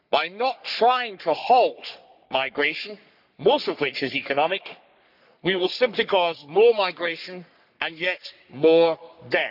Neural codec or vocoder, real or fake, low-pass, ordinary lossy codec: codec, 44.1 kHz, 3.4 kbps, Pupu-Codec; fake; 5.4 kHz; none